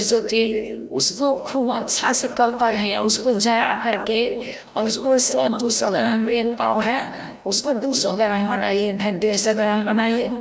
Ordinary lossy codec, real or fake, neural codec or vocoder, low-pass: none; fake; codec, 16 kHz, 0.5 kbps, FreqCodec, larger model; none